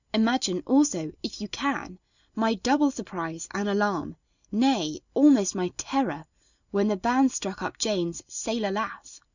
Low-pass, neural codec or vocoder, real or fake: 7.2 kHz; none; real